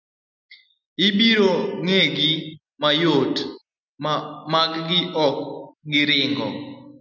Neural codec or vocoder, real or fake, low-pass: none; real; 7.2 kHz